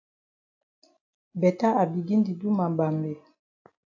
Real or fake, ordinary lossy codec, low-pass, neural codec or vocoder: real; AAC, 48 kbps; 7.2 kHz; none